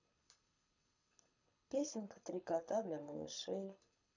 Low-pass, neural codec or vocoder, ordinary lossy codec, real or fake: 7.2 kHz; codec, 24 kHz, 6 kbps, HILCodec; none; fake